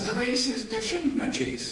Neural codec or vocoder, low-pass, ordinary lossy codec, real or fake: autoencoder, 48 kHz, 32 numbers a frame, DAC-VAE, trained on Japanese speech; 10.8 kHz; MP3, 48 kbps; fake